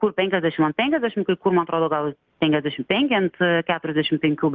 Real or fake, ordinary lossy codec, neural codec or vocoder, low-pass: real; Opus, 32 kbps; none; 7.2 kHz